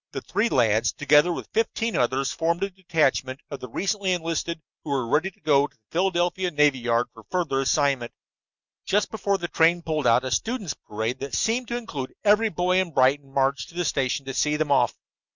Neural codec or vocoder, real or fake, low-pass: none; real; 7.2 kHz